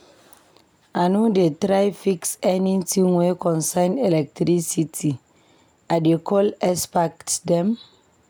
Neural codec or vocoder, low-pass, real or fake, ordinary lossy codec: none; none; real; none